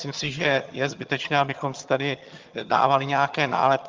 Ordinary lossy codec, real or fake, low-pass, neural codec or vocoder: Opus, 24 kbps; fake; 7.2 kHz; vocoder, 22.05 kHz, 80 mel bands, HiFi-GAN